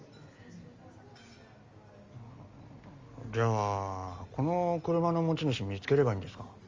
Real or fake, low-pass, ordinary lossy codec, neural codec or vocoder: real; 7.2 kHz; Opus, 32 kbps; none